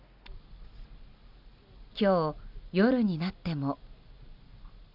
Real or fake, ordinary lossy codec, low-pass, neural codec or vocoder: real; none; 5.4 kHz; none